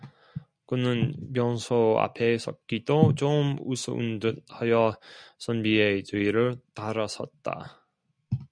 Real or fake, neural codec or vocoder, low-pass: real; none; 9.9 kHz